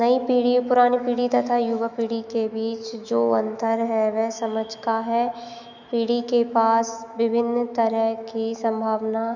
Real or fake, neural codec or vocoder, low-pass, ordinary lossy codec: fake; autoencoder, 48 kHz, 128 numbers a frame, DAC-VAE, trained on Japanese speech; 7.2 kHz; none